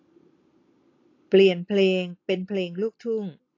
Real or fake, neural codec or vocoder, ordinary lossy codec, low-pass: real; none; AAC, 32 kbps; 7.2 kHz